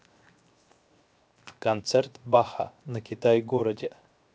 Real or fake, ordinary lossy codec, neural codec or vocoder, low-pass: fake; none; codec, 16 kHz, 0.7 kbps, FocalCodec; none